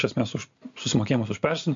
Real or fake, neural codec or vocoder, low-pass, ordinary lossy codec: real; none; 7.2 kHz; MP3, 48 kbps